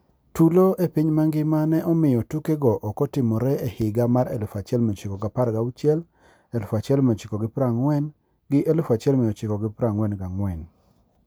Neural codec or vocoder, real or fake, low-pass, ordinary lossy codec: none; real; none; none